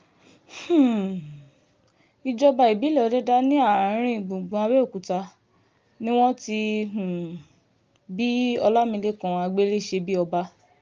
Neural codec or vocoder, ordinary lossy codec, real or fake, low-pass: none; Opus, 24 kbps; real; 7.2 kHz